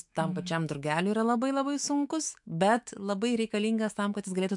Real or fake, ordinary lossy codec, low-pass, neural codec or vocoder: fake; MP3, 64 kbps; 10.8 kHz; autoencoder, 48 kHz, 128 numbers a frame, DAC-VAE, trained on Japanese speech